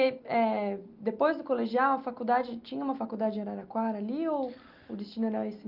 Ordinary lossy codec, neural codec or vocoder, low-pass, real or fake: Opus, 32 kbps; none; 5.4 kHz; real